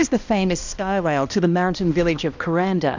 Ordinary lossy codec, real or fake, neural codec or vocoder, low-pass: Opus, 64 kbps; fake; codec, 16 kHz, 1 kbps, X-Codec, HuBERT features, trained on balanced general audio; 7.2 kHz